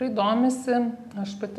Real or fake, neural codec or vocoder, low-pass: real; none; 14.4 kHz